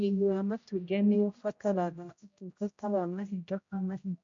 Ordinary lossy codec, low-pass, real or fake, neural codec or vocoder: MP3, 48 kbps; 7.2 kHz; fake; codec, 16 kHz, 0.5 kbps, X-Codec, HuBERT features, trained on general audio